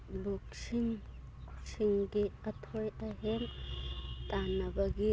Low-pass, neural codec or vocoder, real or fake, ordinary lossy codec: none; none; real; none